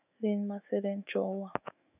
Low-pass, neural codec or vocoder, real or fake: 3.6 kHz; autoencoder, 48 kHz, 128 numbers a frame, DAC-VAE, trained on Japanese speech; fake